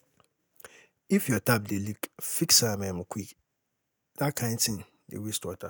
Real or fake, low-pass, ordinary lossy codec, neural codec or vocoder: real; none; none; none